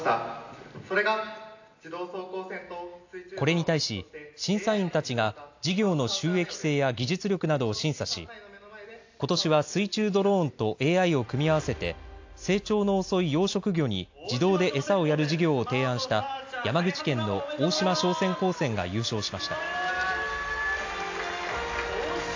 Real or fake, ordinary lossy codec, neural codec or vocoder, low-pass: real; AAC, 48 kbps; none; 7.2 kHz